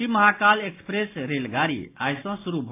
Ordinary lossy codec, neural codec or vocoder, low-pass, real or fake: AAC, 24 kbps; none; 3.6 kHz; real